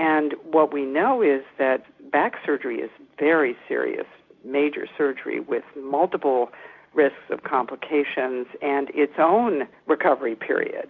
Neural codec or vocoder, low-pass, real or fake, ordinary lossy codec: none; 7.2 kHz; real; MP3, 64 kbps